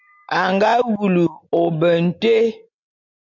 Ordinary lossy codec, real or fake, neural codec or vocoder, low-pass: MP3, 48 kbps; real; none; 7.2 kHz